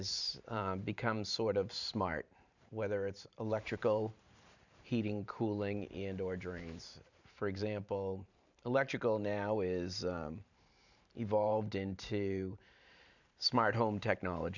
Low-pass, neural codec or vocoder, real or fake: 7.2 kHz; vocoder, 44.1 kHz, 128 mel bands every 512 samples, BigVGAN v2; fake